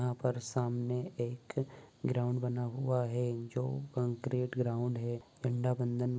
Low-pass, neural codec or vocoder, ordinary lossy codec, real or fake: none; none; none; real